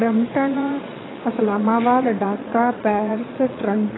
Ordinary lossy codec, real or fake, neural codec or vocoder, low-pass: AAC, 16 kbps; fake; vocoder, 22.05 kHz, 80 mel bands, Vocos; 7.2 kHz